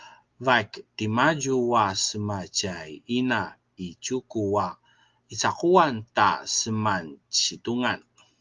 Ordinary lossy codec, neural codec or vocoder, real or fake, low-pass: Opus, 32 kbps; none; real; 7.2 kHz